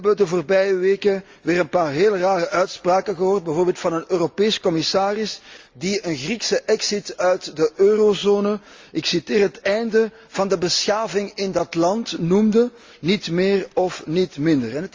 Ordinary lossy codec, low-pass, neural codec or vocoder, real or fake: Opus, 32 kbps; 7.2 kHz; none; real